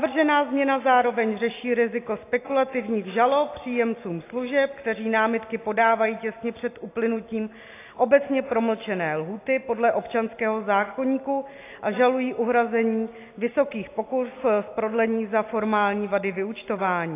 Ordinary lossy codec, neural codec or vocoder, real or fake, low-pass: AAC, 24 kbps; none; real; 3.6 kHz